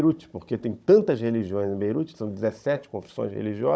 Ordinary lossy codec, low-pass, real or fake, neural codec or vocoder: none; none; fake; codec, 16 kHz, 16 kbps, FunCodec, trained on Chinese and English, 50 frames a second